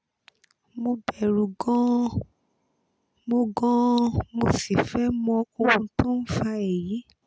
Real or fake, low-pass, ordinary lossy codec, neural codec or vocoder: real; none; none; none